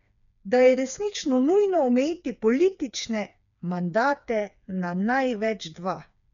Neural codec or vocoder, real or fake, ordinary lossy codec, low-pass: codec, 16 kHz, 4 kbps, FreqCodec, smaller model; fake; none; 7.2 kHz